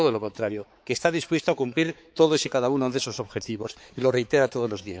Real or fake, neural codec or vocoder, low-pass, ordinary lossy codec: fake; codec, 16 kHz, 4 kbps, X-Codec, HuBERT features, trained on balanced general audio; none; none